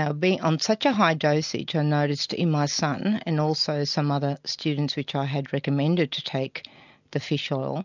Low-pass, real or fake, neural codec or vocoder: 7.2 kHz; real; none